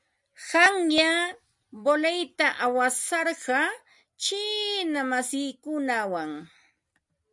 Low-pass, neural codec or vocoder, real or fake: 10.8 kHz; none; real